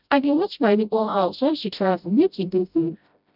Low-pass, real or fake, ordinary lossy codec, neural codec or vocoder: 5.4 kHz; fake; none; codec, 16 kHz, 0.5 kbps, FreqCodec, smaller model